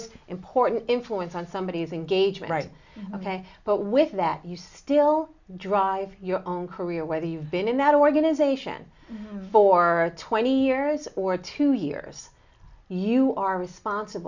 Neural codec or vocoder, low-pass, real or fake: none; 7.2 kHz; real